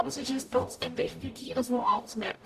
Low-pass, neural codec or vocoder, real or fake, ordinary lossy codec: 14.4 kHz; codec, 44.1 kHz, 0.9 kbps, DAC; fake; none